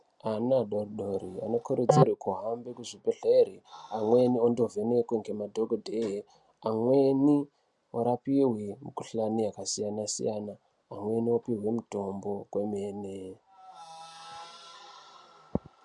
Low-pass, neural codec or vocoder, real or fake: 10.8 kHz; none; real